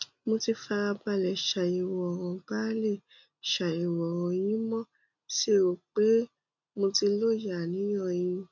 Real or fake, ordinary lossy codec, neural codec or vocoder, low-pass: real; none; none; 7.2 kHz